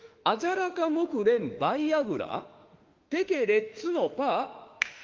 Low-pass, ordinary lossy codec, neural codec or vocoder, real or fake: 7.2 kHz; Opus, 32 kbps; autoencoder, 48 kHz, 32 numbers a frame, DAC-VAE, trained on Japanese speech; fake